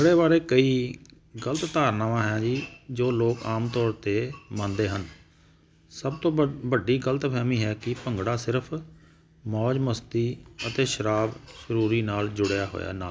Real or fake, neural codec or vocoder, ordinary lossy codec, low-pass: real; none; none; none